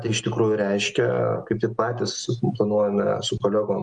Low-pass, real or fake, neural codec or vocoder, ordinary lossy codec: 9.9 kHz; real; none; Opus, 32 kbps